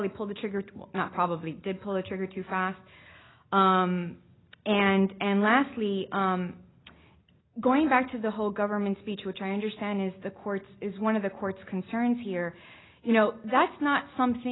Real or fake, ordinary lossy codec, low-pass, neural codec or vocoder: real; AAC, 16 kbps; 7.2 kHz; none